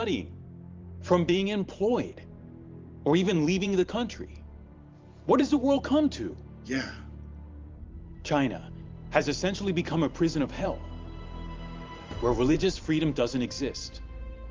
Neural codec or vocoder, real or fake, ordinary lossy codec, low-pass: vocoder, 44.1 kHz, 128 mel bands every 512 samples, BigVGAN v2; fake; Opus, 32 kbps; 7.2 kHz